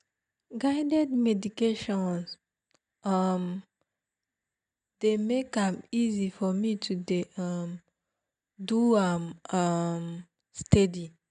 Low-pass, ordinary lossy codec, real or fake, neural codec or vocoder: 9.9 kHz; none; real; none